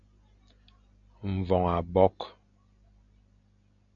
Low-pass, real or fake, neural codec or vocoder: 7.2 kHz; real; none